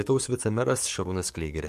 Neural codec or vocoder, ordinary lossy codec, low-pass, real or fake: codec, 44.1 kHz, 7.8 kbps, DAC; MP3, 64 kbps; 14.4 kHz; fake